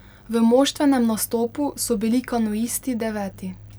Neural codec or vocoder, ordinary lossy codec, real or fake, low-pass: none; none; real; none